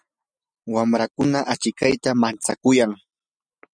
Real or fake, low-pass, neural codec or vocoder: real; 9.9 kHz; none